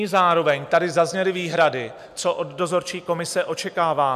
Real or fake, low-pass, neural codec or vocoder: real; 14.4 kHz; none